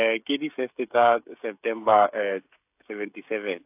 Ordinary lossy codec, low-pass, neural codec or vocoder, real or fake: AAC, 32 kbps; 3.6 kHz; codec, 16 kHz, 16 kbps, FreqCodec, smaller model; fake